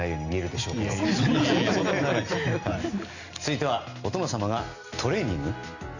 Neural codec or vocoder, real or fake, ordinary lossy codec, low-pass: none; real; none; 7.2 kHz